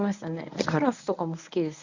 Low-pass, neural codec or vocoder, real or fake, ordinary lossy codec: 7.2 kHz; codec, 24 kHz, 0.9 kbps, WavTokenizer, medium speech release version 1; fake; none